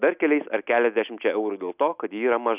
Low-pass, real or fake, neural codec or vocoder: 3.6 kHz; real; none